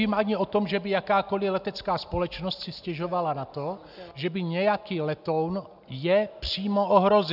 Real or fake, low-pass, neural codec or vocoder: real; 5.4 kHz; none